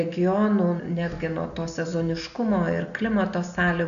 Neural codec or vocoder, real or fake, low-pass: none; real; 7.2 kHz